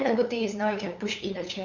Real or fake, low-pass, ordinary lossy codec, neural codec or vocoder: fake; 7.2 kHz; none; codec, 16 kHz, 8 kbps, FunCodec, trained on LibriTTS, 25 frames a second